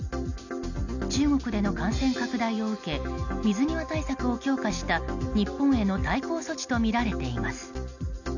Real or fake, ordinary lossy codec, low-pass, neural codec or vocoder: real; none; 7.2 kHz; none